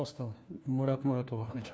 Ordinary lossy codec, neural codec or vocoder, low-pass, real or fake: none; codec, 16 kHz, 1 kbps, FunCodec, trained on LibriTTS, 50 frames a second; none; fake